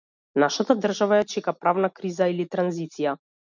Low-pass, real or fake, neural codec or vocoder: 7.2 kHz; real; none